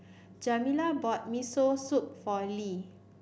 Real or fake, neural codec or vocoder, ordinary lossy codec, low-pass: real; none; none; none